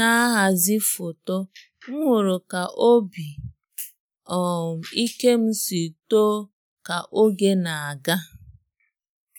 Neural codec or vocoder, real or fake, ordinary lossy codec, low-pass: none; real; none; none